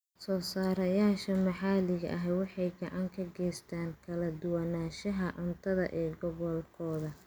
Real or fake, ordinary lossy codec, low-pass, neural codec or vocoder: real; none; none; none